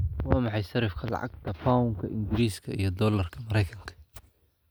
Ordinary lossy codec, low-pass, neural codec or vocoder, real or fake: none; none; none; real